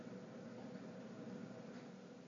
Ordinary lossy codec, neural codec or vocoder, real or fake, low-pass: AAC, 32 kbps; none; real; 7.2 kHz